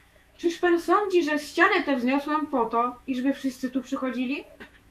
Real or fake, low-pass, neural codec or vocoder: fake; 14.4 kHz; autoencoder, 48 kHz, 128 numbers a frame, DAC-VAE, trained on Japanese speech